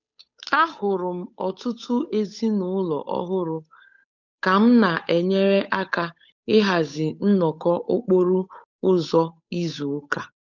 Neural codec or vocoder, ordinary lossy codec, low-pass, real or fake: codec, 16 kHz, 8 kbps, FunCodec, trained on Chinese and English, 25 frames a second; none; 7.2 kHz; fake